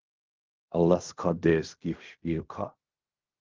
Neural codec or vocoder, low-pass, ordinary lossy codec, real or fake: codec, 16 kHz in and 24 kHz out, 0.4 kbps, LongCat-Audio-Codec, fine tuned four codebook decoder; 7.2 kHz; Opus, 32 kbps; fake